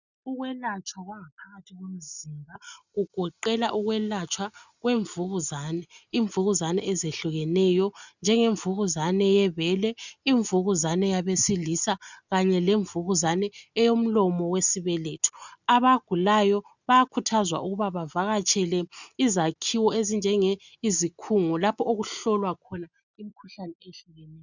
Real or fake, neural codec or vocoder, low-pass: real; none; 7.2 kHz